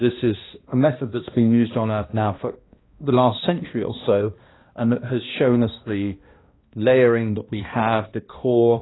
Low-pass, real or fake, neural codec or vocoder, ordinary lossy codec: 7.2 kHz; fake; codec, 16 kHz, 1 kbps, X-Codec, HuBERT features, trained on balanced general audio; AAC, 16 kbps